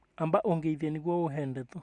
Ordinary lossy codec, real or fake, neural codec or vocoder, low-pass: Opus, 64 kbps; real; none; 10.8 kHz